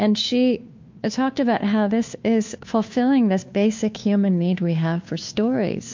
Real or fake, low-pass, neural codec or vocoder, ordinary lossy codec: fake; 7.2 kHz; codec, 16 kHz, 2 kbps, FunCodec, trained on Chinese and English, 25 frames a second; MP3, 48 kbps